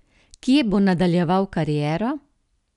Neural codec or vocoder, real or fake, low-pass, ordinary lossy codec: none; real; 10.8 kHz; none